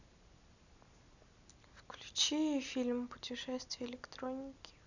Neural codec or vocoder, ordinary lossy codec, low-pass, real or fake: none; none; 7.2 kHz; real